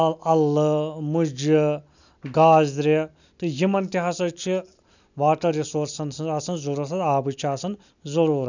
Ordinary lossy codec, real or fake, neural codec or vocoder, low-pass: none; real; none; 7.2 kHz